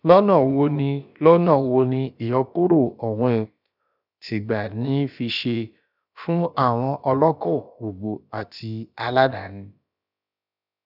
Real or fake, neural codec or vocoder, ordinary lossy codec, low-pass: fake; codec, 16 kHz, about 1 kbps, DyCAST, with the encoder's durations; none; 5.4 kHz